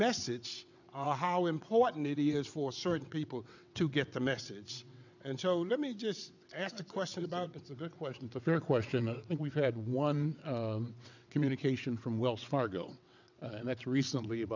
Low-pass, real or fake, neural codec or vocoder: 7.2 kHz; fake; vocoder, 22.05 kHz, 80 mel bands, WaveNeXt